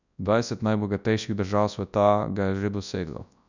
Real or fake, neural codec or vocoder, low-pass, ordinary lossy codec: fake; codec, 24 kHz, 0.9 kbps, WavTokenizer, large speech release; 7.2 kHz; none